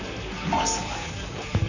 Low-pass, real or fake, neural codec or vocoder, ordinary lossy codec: 7.2 kHz; fake; vocoder, 44.1 kHz, 128 mel bands, Pupu-Vocoder; none